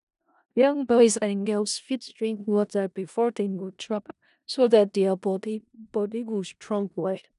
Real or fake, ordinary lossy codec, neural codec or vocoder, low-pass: fake; none; codec, 16 kHz in and 24 kHz out, 0.4 kbps, LongCat-Audio-Codec, four codebook decoder; 10.8 kHz